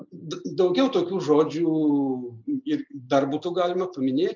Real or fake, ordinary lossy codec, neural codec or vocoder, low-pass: real; MP3, 64 kbps; none; 7.2 kHz